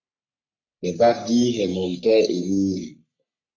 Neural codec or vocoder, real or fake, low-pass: codec, 44.1 kHz, 3.4 kbps, Pupu-Codec; fake; 7.2 kHz